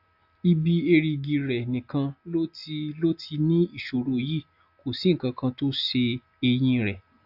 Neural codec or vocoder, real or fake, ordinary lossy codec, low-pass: none; real; AAC, 48 kbps; 5.4 kHz